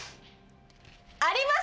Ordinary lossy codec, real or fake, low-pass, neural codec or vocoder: none; real; none; none